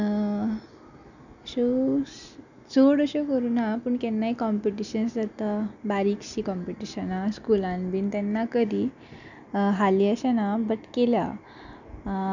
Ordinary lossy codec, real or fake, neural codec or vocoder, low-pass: none; real; none; 7.2 kHz